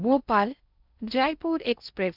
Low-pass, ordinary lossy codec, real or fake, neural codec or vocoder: 5.4 kHz; none; fake; codec, 16 kHz in and 24 kHz out, 0.6 kbps, FocalCodec, streaming, 4096 codes